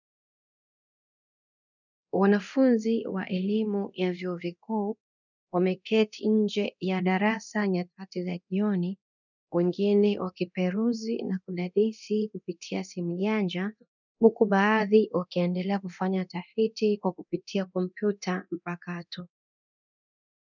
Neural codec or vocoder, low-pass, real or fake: codec, 24 kHz, 0.9 kbps, DualCodec; 7.2 kHz; fake